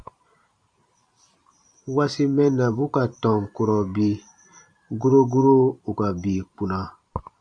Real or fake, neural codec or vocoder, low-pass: fake; vocoder, 44.1 kHz, 128 mel bands every 512 samples, BigVGAN v2; 9.9 kHz